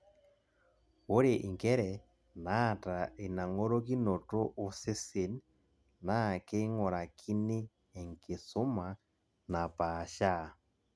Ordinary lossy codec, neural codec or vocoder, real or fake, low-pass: none; none; real; none